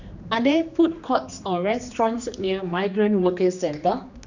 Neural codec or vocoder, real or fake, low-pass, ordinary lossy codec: codec, 16 kHz, 2 kbps, X-Codec, HuBERT features, trained on general audio; fake; 7.2 kHz; none